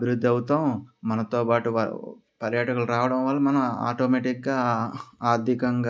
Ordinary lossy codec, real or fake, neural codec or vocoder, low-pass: none; real; none; none